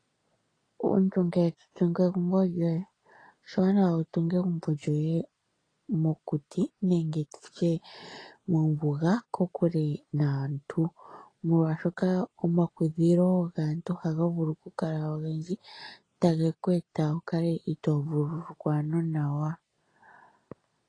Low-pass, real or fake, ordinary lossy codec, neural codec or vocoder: 9.9 kHz; real; AAC, 32 kbps; none